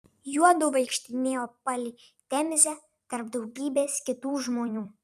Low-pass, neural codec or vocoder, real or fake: 14.4 kHz; vocoder, 44.1 kHz, 128 mel bands every 256 samples, BigVGAN v2; fake